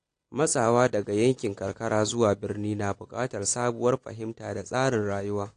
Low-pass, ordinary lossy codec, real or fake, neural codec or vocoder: 9.9 kHz; AAC, 48 kbps; real; none